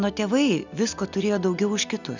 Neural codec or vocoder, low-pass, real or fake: none; 7.2 kHz; real